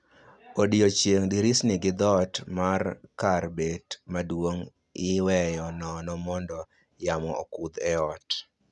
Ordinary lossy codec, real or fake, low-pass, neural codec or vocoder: none; real; 10.8 kHz; none